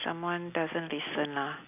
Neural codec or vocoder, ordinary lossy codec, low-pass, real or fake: none; none; 3.6 kHz; real